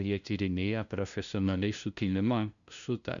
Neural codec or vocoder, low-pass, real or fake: codec, 16 kHz, 0.5 kbps, FunCodec, trained on LibriTTS, 25 frames a second; 7.2 kHz; fake